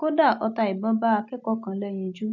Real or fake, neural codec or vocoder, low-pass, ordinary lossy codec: real; none; 7.2 kHz; none